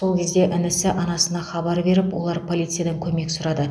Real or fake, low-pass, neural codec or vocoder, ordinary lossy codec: real; none; none; none